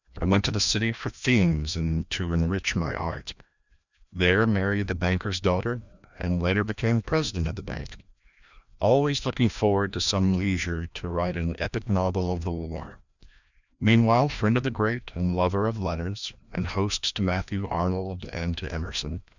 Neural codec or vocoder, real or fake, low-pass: codec, 16 kHz, 1 kbps, FreqCodec, larger model; fake; 7.2 kHz